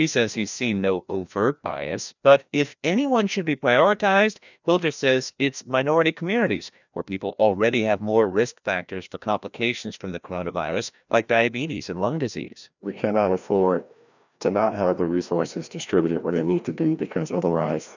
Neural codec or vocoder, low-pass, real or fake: codec, 16 kHz, 1 kbps, FreqCodec, larger model; 7.2 kHz; fake